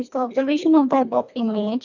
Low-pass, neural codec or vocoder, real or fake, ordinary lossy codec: 7.2 kHz; codec, 24 kHz, 1.5 kbps, HILCodec; fake; none